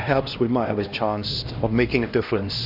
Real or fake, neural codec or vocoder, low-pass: fake; codec, 16 kHz, 1 kbps, X-Codec, HuBERT features, trained on LibriSpeech; 5.4 kHz